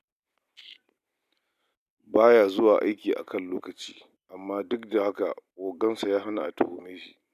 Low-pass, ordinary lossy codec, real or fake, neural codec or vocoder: 14.4 kHz; none; real; none